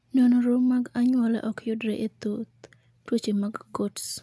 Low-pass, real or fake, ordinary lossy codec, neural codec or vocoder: none; real; none; none